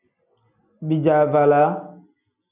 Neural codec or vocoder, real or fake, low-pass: none; real; 3.6 kHz